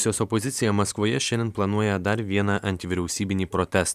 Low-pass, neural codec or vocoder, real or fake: 14.4 kHz; vocoder, 44.1 kHz, 128 mel bands every 512 samples, BigVGAN v2; fake